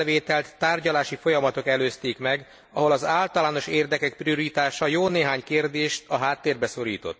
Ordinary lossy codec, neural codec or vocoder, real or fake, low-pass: none; none; real; none